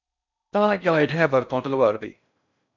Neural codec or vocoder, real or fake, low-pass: codec, 16 kHz in and 24 kHz out, 0.6 kbps, FocalCodec, streaming, 4096 codes; fake; 7.2 kHz